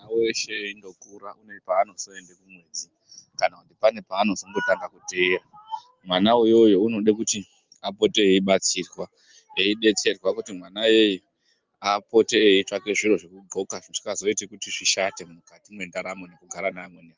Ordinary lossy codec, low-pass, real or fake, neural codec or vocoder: Opus, 24 kbps; 7.2 kHz; real; none